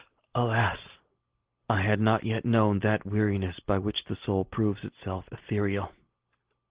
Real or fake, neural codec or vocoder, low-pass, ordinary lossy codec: real; none; 3.6 kHz; Opus, 16 kbps